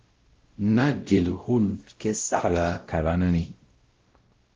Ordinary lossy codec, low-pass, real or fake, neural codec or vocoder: Opus, 16 kbps; 7.2 kHz; fake; codec, 16 kHz, 0.5 kbps, X-Codec, WavLM features, trained on Multilingual LibriSpeech